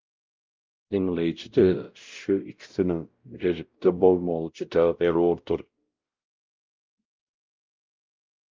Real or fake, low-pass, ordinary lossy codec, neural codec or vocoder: fake; 7.2 kHz; Opus, 24 kbps; codec, 16 kHz, 0.5 kbps, X-Codec, WavLM features, trained on Multilingual LibriSpeech